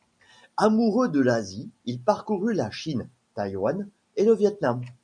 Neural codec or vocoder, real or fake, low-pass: none; real; 9.9 kHz